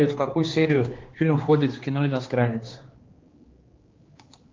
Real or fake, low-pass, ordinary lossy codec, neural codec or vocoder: fake; 7.2 kHz; Opus, 32 kbps; codec, 16 kHz, 2 kbps, X-Codec, HuBERT features, trained on general audio